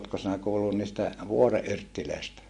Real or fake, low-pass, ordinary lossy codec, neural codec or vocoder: real; 10.8 kHz; MP3, 48 kbps; none